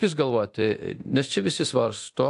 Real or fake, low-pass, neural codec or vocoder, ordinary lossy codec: fake; 10.8 kHz; codec, 24 kHz, 0.9 kbps, DualCodec; AAC, 64 kbps